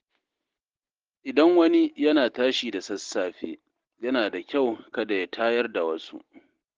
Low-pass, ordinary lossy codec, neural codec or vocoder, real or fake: 7.2 kHz; Opus, 16 kbps; none; real